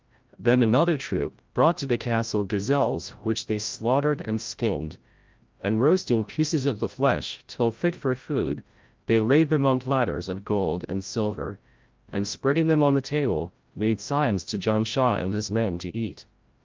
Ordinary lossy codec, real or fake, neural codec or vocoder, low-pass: Opus, 24 kbps; fake; codec, 16 kHz, 0.5 kbps, FreqCodec, larger model; 7.2 kHz